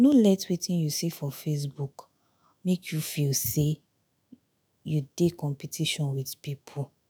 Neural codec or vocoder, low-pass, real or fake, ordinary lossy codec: autoencoder, 48 kHz, 128 numbers a frame, DAC-VAE, trained on Japanese speech; none; fake; none